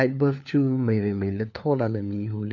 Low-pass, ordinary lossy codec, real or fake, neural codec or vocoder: 7.2 kHz; none; fake; codec, 16 kHz, 4 kbps, FunCodec, trained on LibriTTS, 50 frames a second